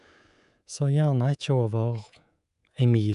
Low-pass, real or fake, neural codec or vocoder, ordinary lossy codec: 10.8 kHz; fake; codec, 24 kHz, 3.1 kbps, DualCodec; none